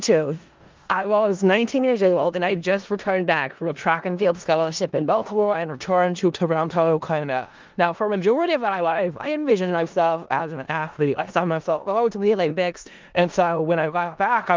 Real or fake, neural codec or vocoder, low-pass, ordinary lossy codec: fake; codec, 16 kHz in and 24 kHz out, 0.4 kbps, LongCat-Audio-Codec, four codebook decoder; 7.2 kHz; Opus, 24 kbps